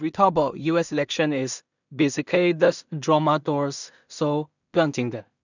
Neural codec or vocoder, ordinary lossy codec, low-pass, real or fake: codec, 16 kHz in and 24 kHz out, 0.4 kbps, LongCat-Audio-Codec, two codebook decoder; none; 7.2 kHz; fake